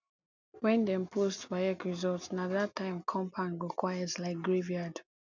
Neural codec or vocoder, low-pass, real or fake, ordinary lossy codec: none; 7.2 kHz; real; none